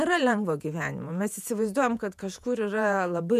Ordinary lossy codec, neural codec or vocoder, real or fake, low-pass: MP3, 96 kbps; vocoder, 48 kHz, 128 mel bands, Vocos; fake; 14.4 kHz